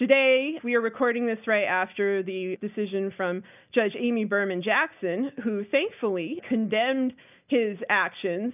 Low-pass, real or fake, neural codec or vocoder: 3.6 kHz; real; none